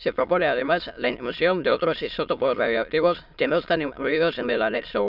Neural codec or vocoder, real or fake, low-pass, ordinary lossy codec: autoencoder, 22.05 kHz, a latent of 192 numbers a frame, VITS, trained on many speakers; fake; 5.4 kHz; none